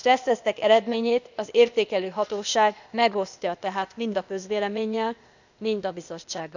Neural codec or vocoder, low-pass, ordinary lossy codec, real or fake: codec, 16 kHz, 0.8 kbps, ZipCodec; 7.2 kHz; none; fake